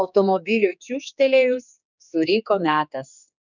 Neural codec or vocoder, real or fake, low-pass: codec, 16 kHz, 4 kbps, X-Codec, HuBERT features, trained on general audio; fake; 7.2 kHz